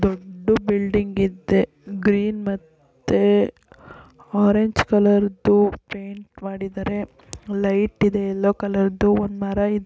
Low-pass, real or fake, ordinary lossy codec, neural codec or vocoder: none; real; none; none